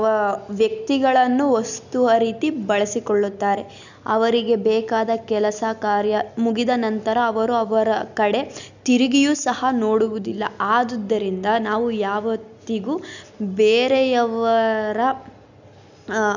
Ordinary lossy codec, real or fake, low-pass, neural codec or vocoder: none; real; 7.2 kHz; none